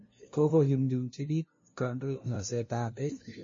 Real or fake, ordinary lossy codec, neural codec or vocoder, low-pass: fake; MP3, 32 kbps; codec, 16 kHz, 0.5 kbps, FunCodec, trained on LibriTTS, 25 frames a second; 7.2 kHz